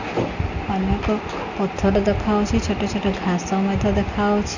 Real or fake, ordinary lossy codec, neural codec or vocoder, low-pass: real; none; none; 7.2 kHz